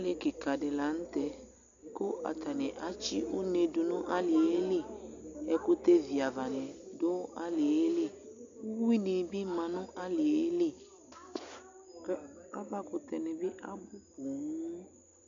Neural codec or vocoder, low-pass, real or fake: none; 7.2 kHz; real